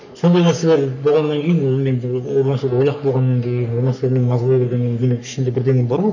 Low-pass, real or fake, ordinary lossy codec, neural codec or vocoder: 7.2 kHz; fake; none; codec, 44.1 kHz, 3.4 kbps, Pupu-Codec